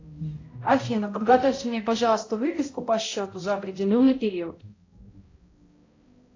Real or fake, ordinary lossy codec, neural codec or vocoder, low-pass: fake; AAC, 32 kbps; codec, 16 kHz, 0.5 kbps, X-Codec, HuBERT features, trained on balanced general audio; 7.2 kHz